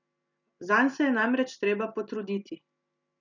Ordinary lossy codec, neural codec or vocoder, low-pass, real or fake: none; none; 7.2 kHz; real